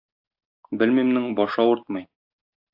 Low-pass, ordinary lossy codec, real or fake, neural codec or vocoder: 5.4 kHz; AAC, 48 kbps; real; none